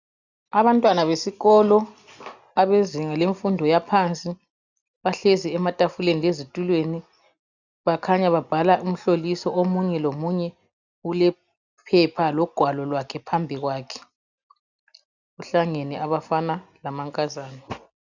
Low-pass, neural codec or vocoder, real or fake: 7.2 kHz; none; real